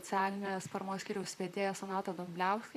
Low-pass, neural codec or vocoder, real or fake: 14.4 kHz; vocoder, 44.1 kHz, 128 mel bands, Pupu-Vocoder; fake